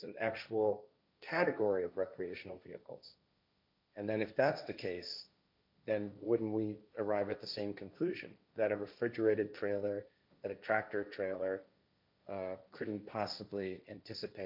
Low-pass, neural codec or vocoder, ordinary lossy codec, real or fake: 5.4 kHz; codec, 16 kHz, 1.1 kbps, Voila-Tokenizer; MP3, 48 kbps; fake